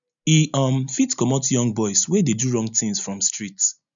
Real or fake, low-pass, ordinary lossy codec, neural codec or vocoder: real; 7.2 kHz; none; none